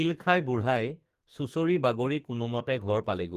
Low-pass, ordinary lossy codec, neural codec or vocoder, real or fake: 14.4 kHz; Opus, 24 kbps; codec, 44.1 kHz, 2.6 kbps, SNAC; fake